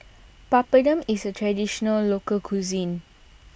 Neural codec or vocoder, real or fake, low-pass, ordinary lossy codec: none; real; none; none